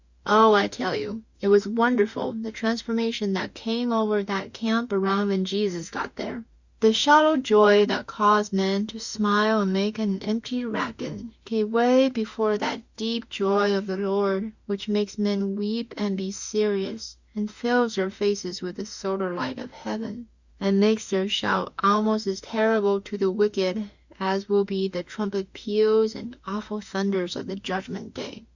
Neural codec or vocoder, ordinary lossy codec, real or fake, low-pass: autoencoder, 48 kHz, 32 numbers a frame, DAC-VAE, trained on Japanese speech; Opus, 64 kbps; fake; 7.2 kHz